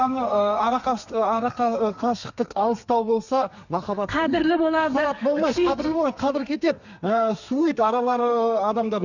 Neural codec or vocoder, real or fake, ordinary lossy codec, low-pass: codec, 32 kHz, 1.9 kbps, SNAC; fake; none; 7.2 kHz